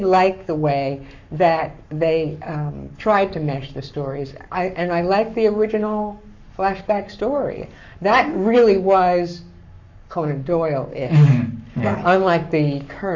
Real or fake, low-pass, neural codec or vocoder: fake; 7.2 kHz; codec, 44.1 kHz, 7.8 kbps, Pupu-Codec